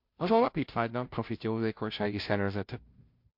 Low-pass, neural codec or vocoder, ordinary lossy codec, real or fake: 5.4 kHz; codec, 16 kHz, 0.5 kbps, FunCodec, trained on Chinese and English, 25 frames a second; MP3, 48 kbps; fake